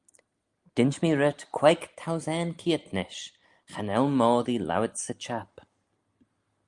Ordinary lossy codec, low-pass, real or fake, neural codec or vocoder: Opus, 32 kbps; 10.8 kHz; real; none